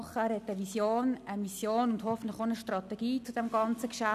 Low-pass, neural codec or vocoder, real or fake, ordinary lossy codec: 14.4 kHz; none; real; none